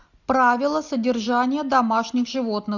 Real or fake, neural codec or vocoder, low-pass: real; none; 7.2 kHz